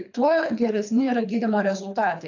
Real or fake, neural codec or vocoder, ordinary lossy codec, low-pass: fake; codec, 24 kHz, 3 kbps, HILCodec; AAC, 48 kbps; 7.2 kHz